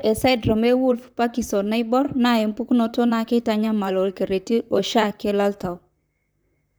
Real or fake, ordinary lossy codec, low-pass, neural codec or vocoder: fake; none; none; vocoder, 44.1 kHz, 128 mel bands, Pupu-Vocoder